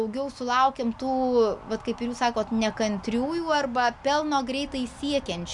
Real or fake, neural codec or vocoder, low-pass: real; none; 10.8 kHz